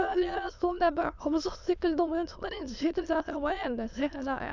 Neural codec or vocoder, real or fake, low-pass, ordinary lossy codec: autoencoder, 22.05 kHz, a latent of 192 numbers a frame, VITS, trained on many speakers; fake; 7.2 kHz; none